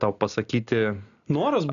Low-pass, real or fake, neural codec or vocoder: 7.2 kHz; real; none